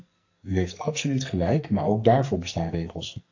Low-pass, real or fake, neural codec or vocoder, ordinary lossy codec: 7.2 kHz; fake; codec, 44.1 kHz, 2.6 kbps, SNAC; AAC, 48 kbps